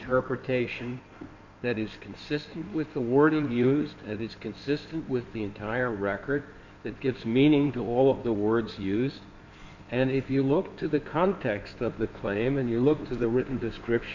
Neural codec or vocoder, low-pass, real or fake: codec, 16 kHz in and 24 kHz out, 2.2 kbps, FireRedTTS-2 codec; 7.2 kHz; fake